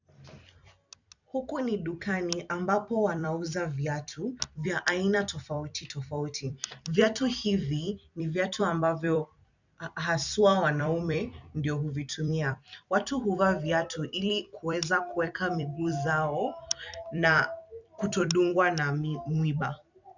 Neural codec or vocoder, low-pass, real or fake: vocoder, 44.1 kHz, 128 mel bands every 256 samples, BigVGAN v2; 7.2 kHz; fake